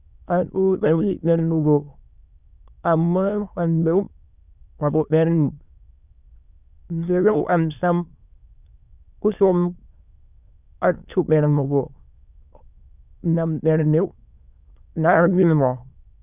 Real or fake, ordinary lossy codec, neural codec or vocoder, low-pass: fake; none; autoencoder, 22.05 kHz, a latent of 192 numbers a frame, VITS, trained on many speakers; 3.6 kHz